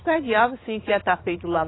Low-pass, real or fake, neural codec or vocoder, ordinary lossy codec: 7.2 kHz; fake; vocoder, 44.1 kHz, 80 mel bands, Vocos; AAC, 16 kbps